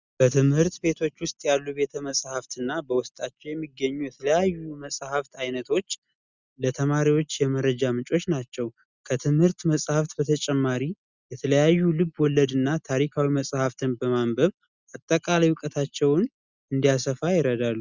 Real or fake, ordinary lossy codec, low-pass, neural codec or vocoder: real; Opus, 64 kbps; 7.2 kHz; none